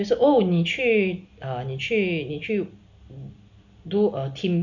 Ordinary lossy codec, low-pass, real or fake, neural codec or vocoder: none; 7.2 kHz; real; none